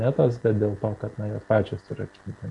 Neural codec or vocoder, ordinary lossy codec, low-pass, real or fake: none; AAC, 64 kbps; 10.8 kHz; real